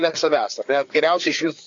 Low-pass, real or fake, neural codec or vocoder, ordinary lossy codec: 7.2 kHz; fake; codec, 16 kHz, 4 kbps, FreqCodec, larger model; AAC, 48 kbps